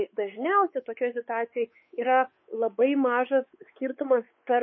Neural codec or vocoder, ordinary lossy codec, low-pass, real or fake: codec, 16 kHz, 4 kbps, X-Codec, WavLM features, trained on Multilingual LibriSpeech; MP3, 24 kbps; 7.2 kHz; fake